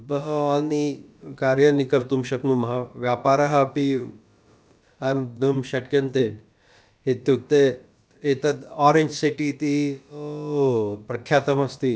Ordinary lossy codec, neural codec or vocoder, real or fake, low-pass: none; codec, 16 kHz, about 1 kbps, DyCAST, with the encoder's durations; fake; none